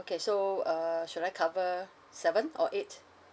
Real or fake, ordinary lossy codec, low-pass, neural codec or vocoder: real; none; none; none